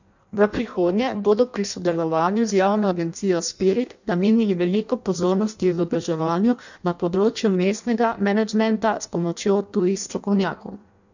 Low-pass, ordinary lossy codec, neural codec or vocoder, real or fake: 7.2 kHz; none; codec, 16 kHz in and 24 kHz out, 0.6 kbps, FireRedTTS-2 codec; fake